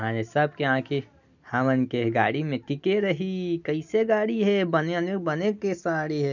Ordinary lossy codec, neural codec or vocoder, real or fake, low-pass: none; none; real; 7.2 kHz